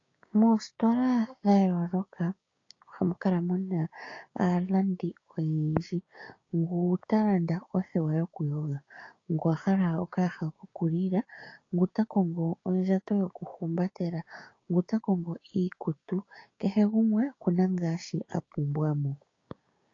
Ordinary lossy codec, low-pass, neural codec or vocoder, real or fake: AAC, 32 kbps; 7.2 kHz; codec, 16 kHz, 6 kbps, DAC; fake